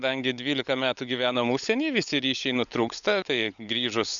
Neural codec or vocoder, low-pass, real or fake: codec, 16 kHz, 16 kbps, FunCodec, trained on LibriTTS, 50 frames a second; 7.2 kHz; fake